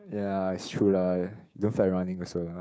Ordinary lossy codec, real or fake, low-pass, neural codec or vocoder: none; fake; none; codec, 16 kHz, 6 kbps, DAC